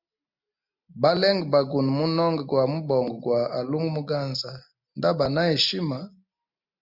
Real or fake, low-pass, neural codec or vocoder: real; 5.4 kHz; none